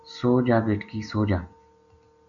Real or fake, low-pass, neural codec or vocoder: real; 7.2 kHz; none